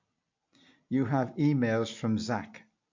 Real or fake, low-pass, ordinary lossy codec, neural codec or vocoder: real; 7.2 kHz; MP3, 48 kbps; none